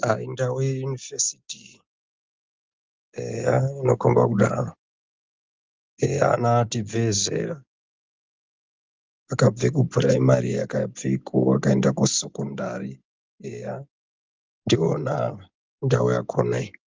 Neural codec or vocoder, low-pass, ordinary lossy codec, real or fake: none; 7.2 kHz; Opus, 24 kbps; real